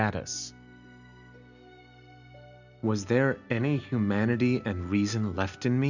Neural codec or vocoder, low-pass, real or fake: none; 7.2 kHz; real